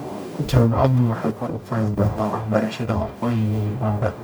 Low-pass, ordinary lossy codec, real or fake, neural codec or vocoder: none; none; fake; codec, 44.1 kHz, 0.9 kbps, DAC